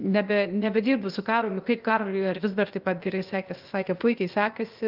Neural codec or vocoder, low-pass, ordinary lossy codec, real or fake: codec, 16 kHz, 0.8 kbps, ZipCodec; 5.4 kHz; Opus, 32 kbps; fake